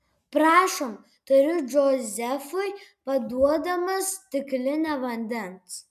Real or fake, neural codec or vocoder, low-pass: real; none; 14.4 kHz